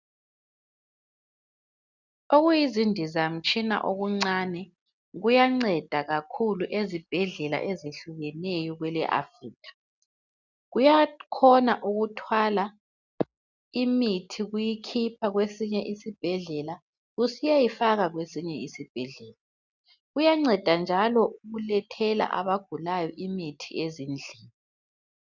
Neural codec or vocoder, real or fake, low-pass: none; real; 7.2 kHz